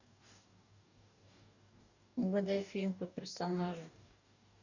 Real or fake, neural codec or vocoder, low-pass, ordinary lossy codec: fake; codec, 44.1 kHz, 2.6 kbps, DAC; 7.2 kHz; none